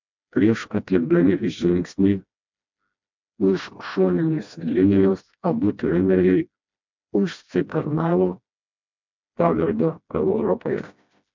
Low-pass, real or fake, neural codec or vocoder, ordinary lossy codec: 7.2 kHz; fake; codec, 16 kHz, 1 kbps, FreqCodec, smaller model; MP3, 64 kbps